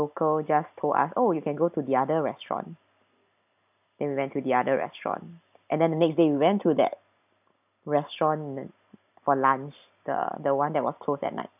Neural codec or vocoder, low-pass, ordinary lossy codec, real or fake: none; 3.6 kHz; none; real